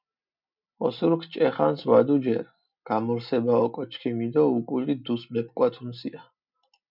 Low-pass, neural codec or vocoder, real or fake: 5.4 kHz; none; real